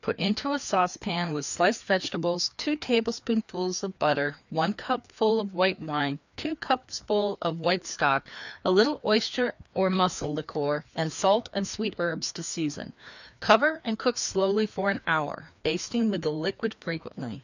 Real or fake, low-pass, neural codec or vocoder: fake; 7.2 kHz; codec, 16 kHz, 2 kbps, FreqCodec, larger model